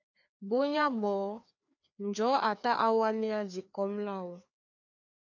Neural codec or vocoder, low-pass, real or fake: codec, 16 kHz, 2 kbps, FreqCodec, larger model; 7.2 kHz; fake